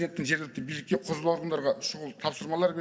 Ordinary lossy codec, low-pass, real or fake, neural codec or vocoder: none; none; real; none